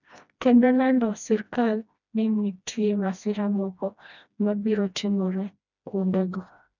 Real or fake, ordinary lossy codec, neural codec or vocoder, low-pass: fake; none; codec, 16 kHz, 1 kbps, FreqCodec, smaller model; 7.2 kHz